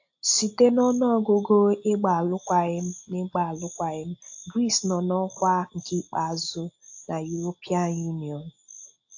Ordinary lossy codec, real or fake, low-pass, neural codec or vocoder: AAC, 48 kbps; real; 7.2 kHz; none